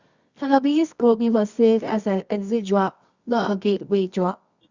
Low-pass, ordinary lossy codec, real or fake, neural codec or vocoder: 7.2 kHz; Opus, 64 kbps; fake; codec, 24 kHz, 0.9 kbps, WavTokenizer, medium music audio release